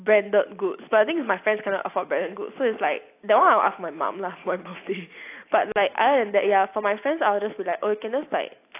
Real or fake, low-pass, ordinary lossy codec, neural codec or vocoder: real; 3.6 kHz; AAC, 24 kbps; none